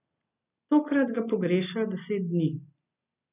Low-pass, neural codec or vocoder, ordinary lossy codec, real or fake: 3.6 kHz; none; none; real